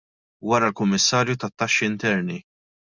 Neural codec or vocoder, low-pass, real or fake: none; 7.2 kHz; real